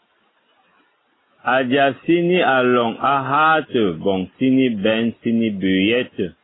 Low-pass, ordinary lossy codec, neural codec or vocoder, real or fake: 7.2 kHz; AAC, 16 kbps; none; real